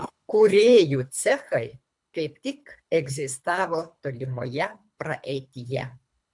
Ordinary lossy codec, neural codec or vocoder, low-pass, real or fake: MP3, 96 kbps; codec, 24 kHz, 3 kbps, HILCodec; 10.8 kHz; fake